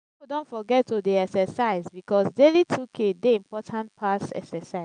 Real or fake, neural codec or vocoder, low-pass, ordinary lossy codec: real; none; 9.9 kHz; none